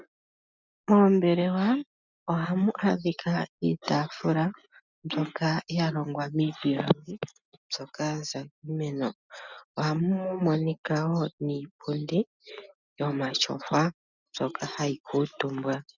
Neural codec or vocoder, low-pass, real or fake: none; 7.2 kHz; real